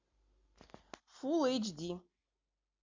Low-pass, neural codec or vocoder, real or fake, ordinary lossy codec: 7.2 kHz; none; real; MP3, 64 kbps